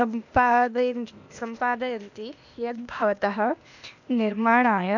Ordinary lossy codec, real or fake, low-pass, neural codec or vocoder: none; fake; 7.2 kHz; codec, 16 kHz, 0.8 kbps, ZipCodec